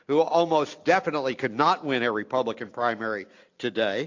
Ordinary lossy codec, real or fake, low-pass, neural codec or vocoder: AAC, 48 kbps; real; 7.2 kHz; none